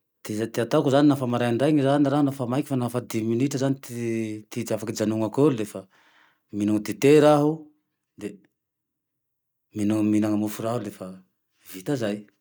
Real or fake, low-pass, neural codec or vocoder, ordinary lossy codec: real; none; none; none